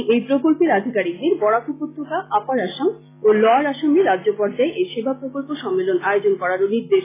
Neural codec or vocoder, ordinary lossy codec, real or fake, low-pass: none; AAC, 16 kbps; real; 3.6 kHz